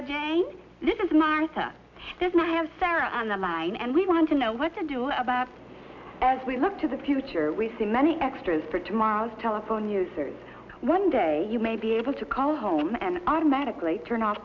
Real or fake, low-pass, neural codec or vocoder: real; 7.2 kHz; none